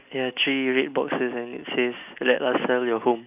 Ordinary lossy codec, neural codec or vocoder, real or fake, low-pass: none; none; real; 3.6 kHz